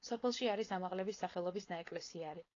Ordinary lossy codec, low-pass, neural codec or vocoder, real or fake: AAC, 32 kbps; 7.2 kHz; codec, 16 kHz, 4.8 kbps, FACodec; fake